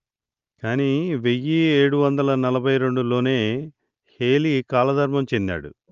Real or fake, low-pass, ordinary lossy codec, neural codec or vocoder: real; 7.2 kHz; Opus, 24 kbps; none